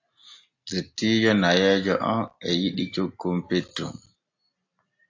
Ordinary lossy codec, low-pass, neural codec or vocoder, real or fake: AAC, 48 kbps; 7.2 kHz; none; real